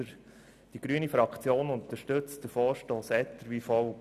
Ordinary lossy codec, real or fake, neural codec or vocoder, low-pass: none; real; none; 14.4 kHz